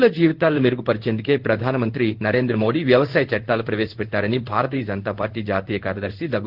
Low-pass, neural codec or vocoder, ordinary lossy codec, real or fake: 5.4 kHz; codec, 16 kHz in and 24 kHz out, 1 kbps, XY-Tokenizer; Opus, 16 kbps; fake